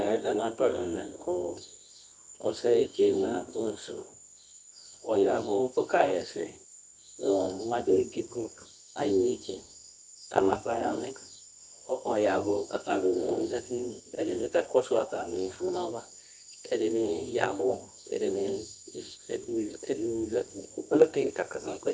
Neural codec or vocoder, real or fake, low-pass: codec, 24 kHz, 0.9 kbps, WavTokenizer, medium music audio release; fake; 9.9 kHz